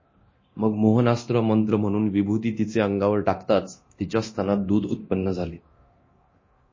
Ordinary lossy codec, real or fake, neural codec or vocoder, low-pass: MP3, 32 kbps; fake; codec, 24 kHz, 0.9 kbps, DualCodec; 7.2 kHz